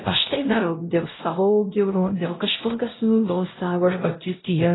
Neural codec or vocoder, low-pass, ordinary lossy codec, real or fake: codec, 16 kHz, 0.5 kbps, X-Codec, WavLM features, trained on Multilingual LibriSpeech; 7.2 kHz; AAC, 16 kbps; fake